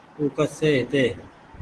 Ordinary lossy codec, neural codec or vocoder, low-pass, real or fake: Opus, 16 kbps; none; 10.8 kHz; real